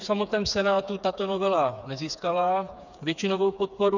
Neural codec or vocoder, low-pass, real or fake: codec, 16 kHz, 4 kbps, FreqCodec, smaller model; 7.2 kHz; fake